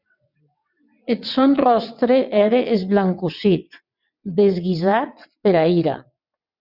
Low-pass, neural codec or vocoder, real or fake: 5.4 kHz; vocoder, 22.05 kHz, 80 mel bands, WaveNeXt; fake